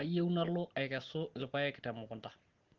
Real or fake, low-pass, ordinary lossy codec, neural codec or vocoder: real; 7.2 kHz; Opus, 16 kbps; none